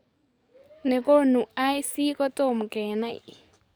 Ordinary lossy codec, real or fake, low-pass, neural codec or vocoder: none; fake; none; codec, 44.1 kHz, 7.8 kbps, DAC